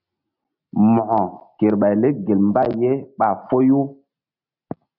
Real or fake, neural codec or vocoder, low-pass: real; none; 5.4 kHz